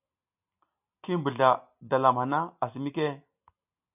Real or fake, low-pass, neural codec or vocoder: real; 3.6 kHz; none